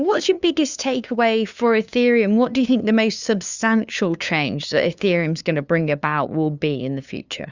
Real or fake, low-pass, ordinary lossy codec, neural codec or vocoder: fake; 7.2 kHz; Opus, 64 kbps; codec, 16 kHz, 2 kbps, FunCodec, trained on LibriTTS, 25 frames a second